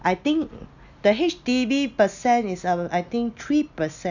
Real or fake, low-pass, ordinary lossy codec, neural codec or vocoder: real; 7.2 kHz; none; none